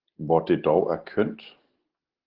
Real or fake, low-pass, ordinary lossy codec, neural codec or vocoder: real; 5.4 kHz; Opus, 16 kbps; none